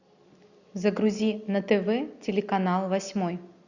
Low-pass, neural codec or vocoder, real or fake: 7.2 kHz; none; real